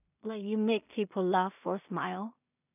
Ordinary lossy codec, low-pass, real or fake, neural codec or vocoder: none; 3.6 kHz; fake; codec, 16 kHz in and 24 kHz out, 0.4 kbps, LongCat-Audio-Codec, two codebook decoder